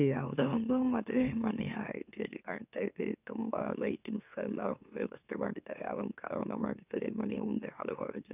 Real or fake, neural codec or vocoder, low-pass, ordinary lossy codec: fake; autoencoder, 44.1 kHz, a latent of 192 numbers a frame, MeloTTS; 3.6 kHz; none